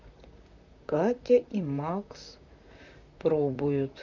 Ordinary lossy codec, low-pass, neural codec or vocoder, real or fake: none; 7.2 kHz; vocoder, 44.1 kHz, 128 mel bands, Pupu-Vocoder; fake